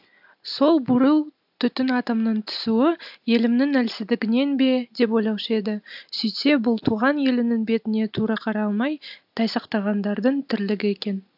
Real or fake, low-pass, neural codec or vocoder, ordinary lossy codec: real; 5.4 kHz; none; AAC, 48 kbps